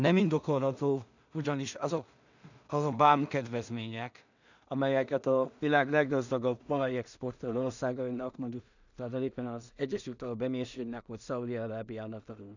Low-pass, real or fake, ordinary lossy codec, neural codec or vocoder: 7.2 kHz; fake; none; codec, 16 kHz in and 24 kHz out, 0.4 kbps, LongCat-Audio-Codec, two codebook decoder